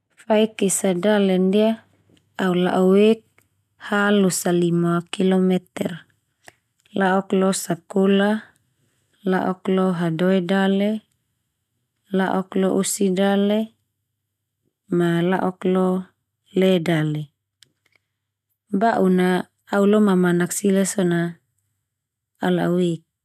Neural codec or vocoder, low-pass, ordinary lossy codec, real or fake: none; 14.4 kHz; none; real